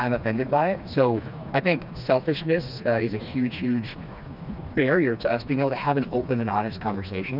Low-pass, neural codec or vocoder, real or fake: 5.4 kHz; codec, 16 kHz, 2 kbps, FreqCodec, smaller model; fake